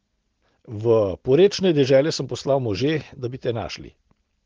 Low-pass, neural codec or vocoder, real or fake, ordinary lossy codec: 7.2 kHz; none; real; Opus, 16 kbps